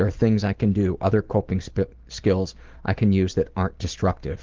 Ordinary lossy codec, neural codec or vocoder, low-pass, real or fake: Opus, 16 kbps; none; 7.2 kHz; real